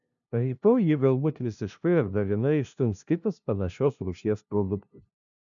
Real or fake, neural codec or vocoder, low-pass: fake; codec, 16 kHz, 0.5 kbps, FunCodec, trained on LibriTTS, 25 frames a second; 7.2 kHz